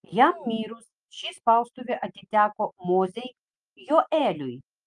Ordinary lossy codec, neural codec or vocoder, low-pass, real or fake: Opus, 32 kbps; none; 10.8 kHz; real